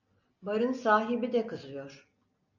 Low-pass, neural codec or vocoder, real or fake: 7.2 kHz; none; real